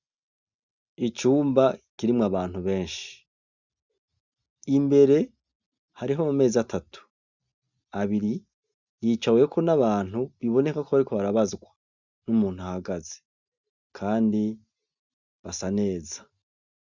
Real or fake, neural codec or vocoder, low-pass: real; none; 7.2 kHz